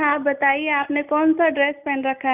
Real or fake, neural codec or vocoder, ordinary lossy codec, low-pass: real; none; none; 3.6 kHz